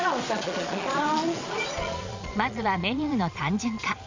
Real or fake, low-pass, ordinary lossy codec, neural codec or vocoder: fake; 7.2 kHz; MP3, 64 kbps; vocoder, 22.05 kHz, 80 mel bands, Vocos